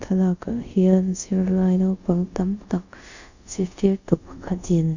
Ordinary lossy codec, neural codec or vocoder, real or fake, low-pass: none; codec, 24 kHz, 0.5 kbps, DualCodec; fake; 7.2 kHz